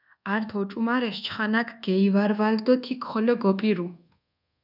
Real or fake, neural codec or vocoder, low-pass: fake; codec, 24 kHz, 1.2 kbps, DualCodec; 5.4 kHz